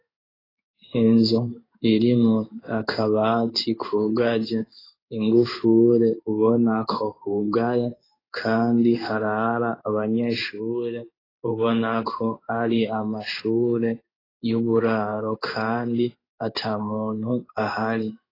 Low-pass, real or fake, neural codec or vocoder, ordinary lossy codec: 5.4 kHz; fake; codec, 16 kHz in and 24 kHz out, 1 kbps, XY-Tokenizer; AAC, 24 kbps